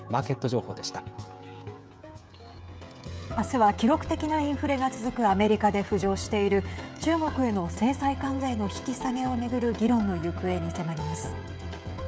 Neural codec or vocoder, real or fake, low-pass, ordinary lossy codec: codec, 16 kHz, 16 kbps, FreqCodec, smaller model; fake; none; none